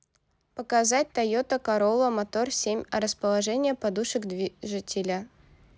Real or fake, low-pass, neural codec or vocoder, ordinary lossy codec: real; none; none; none